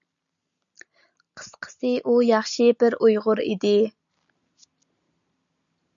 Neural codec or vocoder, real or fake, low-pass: none; real; 7.2 kHz